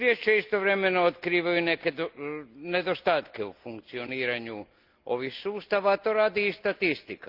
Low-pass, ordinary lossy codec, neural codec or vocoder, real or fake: 5.4 kHz; Opus, 32 kbps; none; real